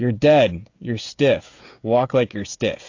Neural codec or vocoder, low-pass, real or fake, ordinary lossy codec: codec, 16 kHz, 16 kbps, FreqCodec, smaller model; 7.2 kHz; fake; AAC, 48 kbps